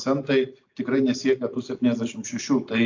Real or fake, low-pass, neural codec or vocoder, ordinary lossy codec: real; 7.2 kHz; none; AAC, 48 kbps